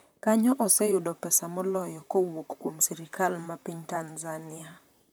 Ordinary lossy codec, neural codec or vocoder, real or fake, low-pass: none; vocoder, 44.1 kHz, 128 mel bands, Pupu-Vocoder; fake; none